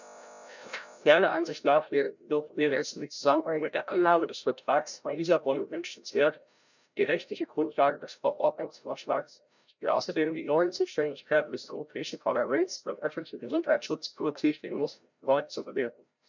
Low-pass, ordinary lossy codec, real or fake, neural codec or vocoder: 7.2 kHz; none; fake; codec, 16 kHz, 0.5 kbps, FreqCodec, larger model